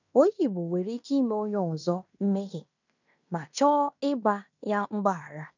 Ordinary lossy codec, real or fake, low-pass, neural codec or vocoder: none; fake; 7.2 kHz; codec, 16 kHz in and 24 kHz out, 0.9 kbps, LongCat-Audio-Codec, fine tuned four codebook decoder